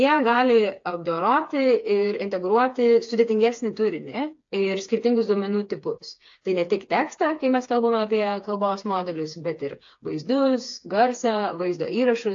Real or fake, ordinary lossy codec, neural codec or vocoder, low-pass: fake; AAC, 48 kbps; codec, 16 kHz, 4 kbps, FreqCodec, smaller model; 7.2 kHz